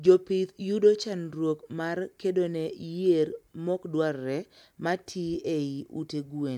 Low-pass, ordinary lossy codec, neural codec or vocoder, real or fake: 19.8 kHz; MP3, 96 kbps; none; real